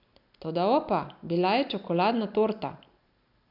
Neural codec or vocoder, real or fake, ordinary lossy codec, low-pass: none; real; none; 5.4 kHz